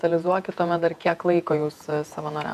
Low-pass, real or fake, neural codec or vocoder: 14.4 kHz; fake; vocoder, 48 kHz, 128 mel bands, Vocos